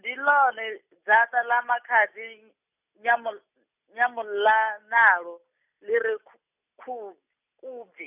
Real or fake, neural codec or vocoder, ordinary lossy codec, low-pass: real; none; none; 3.6 kHz